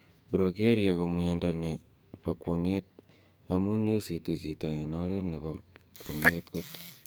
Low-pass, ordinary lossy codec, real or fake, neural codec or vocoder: none; none; fake; codec, 44.1 kHz, 2.6 kbps, SNAC